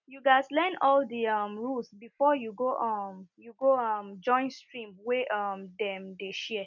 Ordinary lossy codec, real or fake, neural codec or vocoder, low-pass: none; real; none; 7.2 kHz